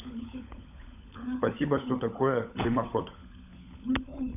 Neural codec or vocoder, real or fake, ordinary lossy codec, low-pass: codec, 16 kHz, 16 kbps, FunCodec, trained on LibriTTS, 50 frames a second; fake; none; 3.6 kHz